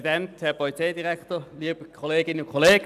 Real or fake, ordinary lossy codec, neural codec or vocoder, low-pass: real; none; none; 14.4 kHz